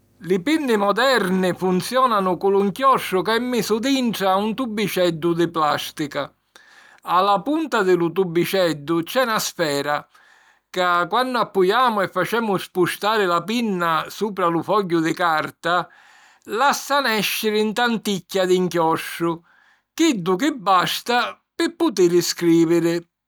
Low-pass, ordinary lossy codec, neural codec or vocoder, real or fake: none; none; none; real